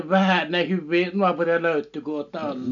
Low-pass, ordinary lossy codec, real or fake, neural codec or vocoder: 7.2 kHz; none; real; none